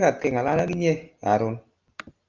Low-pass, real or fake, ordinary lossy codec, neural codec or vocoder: 7.2 kHz; real; Opus, 32 kbps; none